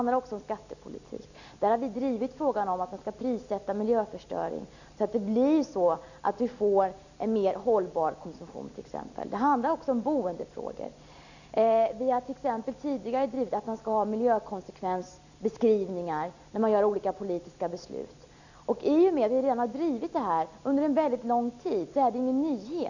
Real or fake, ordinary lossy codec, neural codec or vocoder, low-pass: real; none; none; 7.2 kHz